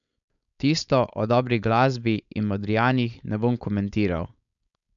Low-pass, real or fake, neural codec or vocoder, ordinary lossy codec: 7.2 kHz; fake; codec, 16 kHz, 4.8 kbps, FACodec; none